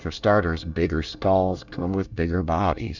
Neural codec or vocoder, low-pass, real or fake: codec, 24 kHz, 1 kbps, SNAC; 7.2 kHz; fake